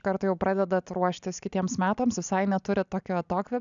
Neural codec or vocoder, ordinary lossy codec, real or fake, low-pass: none; MP3, 96 kbps; real; 7.2 kHz